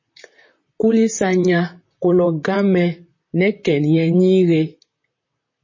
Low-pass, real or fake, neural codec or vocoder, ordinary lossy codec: 7.2 kHz; fake; vocoder, 44.1 kHz, 128 mel bands, Pupu-Vocoder; MP3, 32 kbps